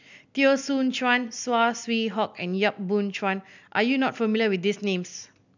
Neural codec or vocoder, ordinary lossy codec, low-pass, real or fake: none; none; 7.2 kHz; real